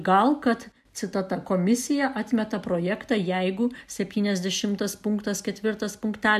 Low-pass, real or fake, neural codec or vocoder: 14.4 kHz; real; none